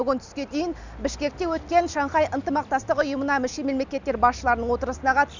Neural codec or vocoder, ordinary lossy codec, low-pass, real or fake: none; none; 7.2 kHz; real